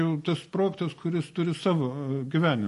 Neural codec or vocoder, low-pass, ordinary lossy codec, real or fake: none; 14.4 kHz; MP3, 48 kbps; real